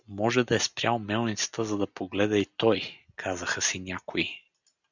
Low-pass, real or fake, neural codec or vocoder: 7.2 kHz; real; none